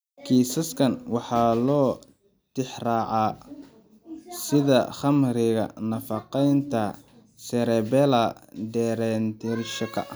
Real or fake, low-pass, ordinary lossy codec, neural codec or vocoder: real; none; none; none